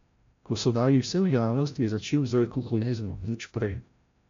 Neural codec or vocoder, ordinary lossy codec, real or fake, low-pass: codec, 16 kHz, 0.5 kbps, FreqCodec, larger model; MP3, 64 kbps; fake; 7.2 kHz